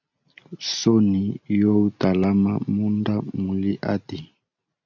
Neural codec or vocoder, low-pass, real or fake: none; 7.2 kHz; real